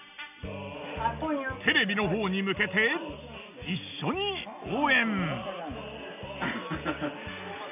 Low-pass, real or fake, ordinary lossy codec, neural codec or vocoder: 3.6 kHz; real; none; none